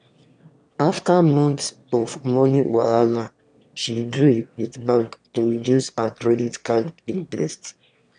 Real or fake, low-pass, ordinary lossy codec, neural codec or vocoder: fake; 9.9 kHz; none; autoencoder, 22.05 kHz, a latent of 192 numbers a frame, VITS, trained on one speaker